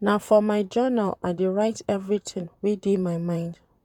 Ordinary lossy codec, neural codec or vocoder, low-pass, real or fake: none; vocoder, 44.1 kHz, 128 mel bands, Pupu-Vocoder; 19.8 kHz; fake